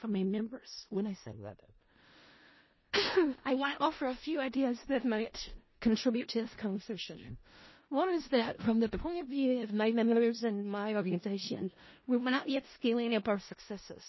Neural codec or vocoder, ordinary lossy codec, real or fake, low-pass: codec, 16 kHz in and 24 kHz out, 0.4 kbps, LongCat-Audio-Codec, four codebook decoder; MP3, 24 kbps; fake; 7.2 kHz